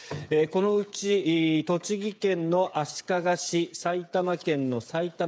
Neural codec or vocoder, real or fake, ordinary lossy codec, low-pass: codec, 16 kHz, 8 kbps, FreqCodec, smaller model; fake; none; none